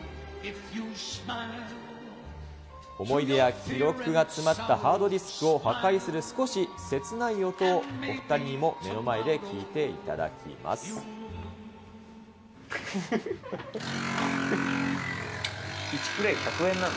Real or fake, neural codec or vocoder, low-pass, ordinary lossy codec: real; none; none; none